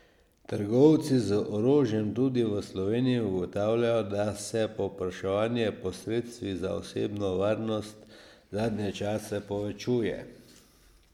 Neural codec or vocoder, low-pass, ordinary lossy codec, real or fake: none; 19.8 kHz; MP3, 96 kbps; real